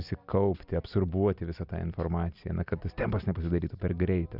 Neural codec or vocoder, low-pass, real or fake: none; 5.4 kHz; real